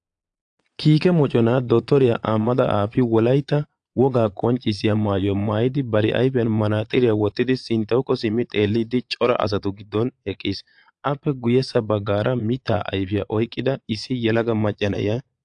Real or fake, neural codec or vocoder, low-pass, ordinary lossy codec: fake; vocoder, 22.05 kHz, 80 mel bands, Vocos; 9.9 kHz; AAC, 64 kbps